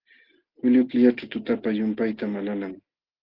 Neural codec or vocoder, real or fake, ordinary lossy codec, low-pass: none; real; Opus, 16 kbps; 5.4 kHz